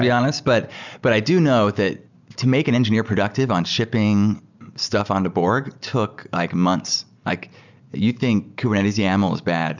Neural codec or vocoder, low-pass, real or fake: none; 7.2 kHz; real